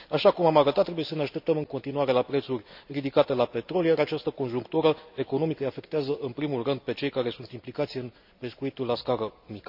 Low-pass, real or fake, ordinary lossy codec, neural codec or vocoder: 5.4 kHz; real; none; none